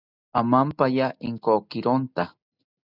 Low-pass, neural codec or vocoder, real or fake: 5.4 kHz; none; real